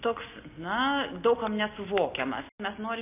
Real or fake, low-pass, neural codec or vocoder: real; 3.6 kHz; none